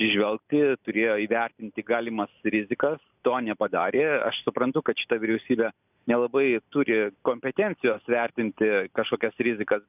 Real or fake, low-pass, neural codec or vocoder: real; 3.6 kHz; none